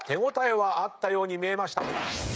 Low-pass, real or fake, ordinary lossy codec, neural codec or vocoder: none; fake; none; codec, 16 kHz, 16 kbps, FreqCodec, smaller model